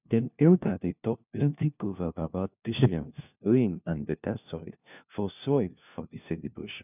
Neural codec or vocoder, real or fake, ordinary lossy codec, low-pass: codec, 16 kHz, 1 kbps, FunCodec, trained on LibriTTS, 50 frames a second; fake; none; 3.6 kHz